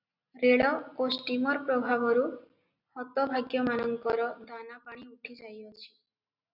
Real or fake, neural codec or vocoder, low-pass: real; none; 5.4 kHz